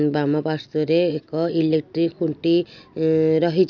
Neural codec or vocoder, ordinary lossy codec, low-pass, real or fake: none; none; 7.2 kHz; real